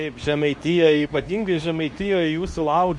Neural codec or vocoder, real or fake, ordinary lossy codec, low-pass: codec, 24 kHz, 0.9 kbps, WavTokenizer, medium speech release version 2; fake; MP3, 64 kbps; 10.8 kHz